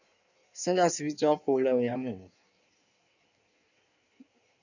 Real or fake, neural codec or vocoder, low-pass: fake; codec, 16 kHz in and 24 kHz out, 1.1 kbps, FireRedTTS-2 codec; 7.2 kHz